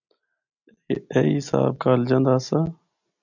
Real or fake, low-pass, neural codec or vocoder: real; 7.2 kHz; none